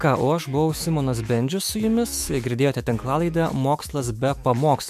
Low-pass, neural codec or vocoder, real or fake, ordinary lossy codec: 14.4 kHz; autoencoder, 48 kHz, 128 numbers a frame, DAC-VAE, trained on Japanese speech; fake; MP3, 96 kbps